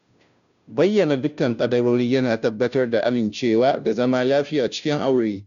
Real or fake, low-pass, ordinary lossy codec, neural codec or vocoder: fake; 7.2 kHz; none; codec, 16 kHz, 0.5 kbps, FunCodec, trained on Chinese and English, 25 frames a second